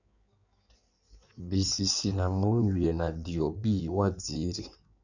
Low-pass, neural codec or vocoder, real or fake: 7.2 kHz; codec, 16 kHz in and 24 kHz out, 1.1 kbps, FireRedTTS-2 codec; fake